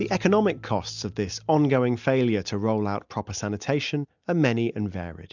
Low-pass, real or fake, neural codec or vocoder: 7.2 kHz; real; none